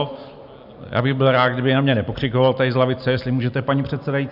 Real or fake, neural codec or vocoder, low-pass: real; none; 5.4 kHz